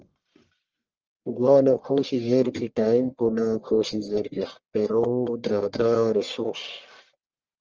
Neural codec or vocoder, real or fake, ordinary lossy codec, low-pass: codec, 44.1 kHz, 1.7 kbps, Pupu-Codec; fake; Opus, 24 kbps; 7.2 kHz